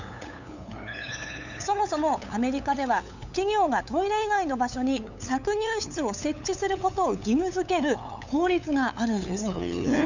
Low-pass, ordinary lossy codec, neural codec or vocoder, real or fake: 7.2 kHz; none; codec, 16 kHz, 8 kbps, FunCodec, trained on LibriTTS, 25 frames a second; fake